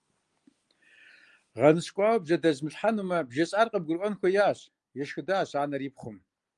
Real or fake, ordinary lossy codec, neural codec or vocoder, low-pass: real; Opus, 32 kbps; none; 10.8 kHz